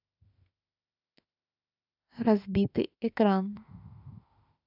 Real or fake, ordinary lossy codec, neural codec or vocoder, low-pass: fake; none; autoencoder, 48 kHz, 32 numbers a frame, DAC-VAE, trained on Japanese speech; 5.4 kHz